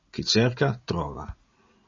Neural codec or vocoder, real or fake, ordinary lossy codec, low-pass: none; real; MP3, 32 kbps; 7.2 kHz